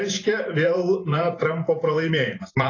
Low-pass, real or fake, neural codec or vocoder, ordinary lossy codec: 7.2 kHz; fake; vocoder, 44.1 kHz, 128 mel bands every 512 samples, BigVGAN v2; AAC, 32 kbps